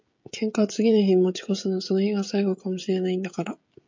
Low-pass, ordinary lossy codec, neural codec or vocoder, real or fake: 7.2 kHz; MP3, 48 kbps; codec, 16 kHz, 16 kbps, FreqCodec, smaller model; fake